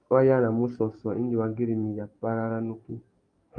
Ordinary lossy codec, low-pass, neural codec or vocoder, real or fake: Opus, 32 kbps; 9.9 kHz; codec, 44.1 kHz, 7.8 kbps, DAC; fake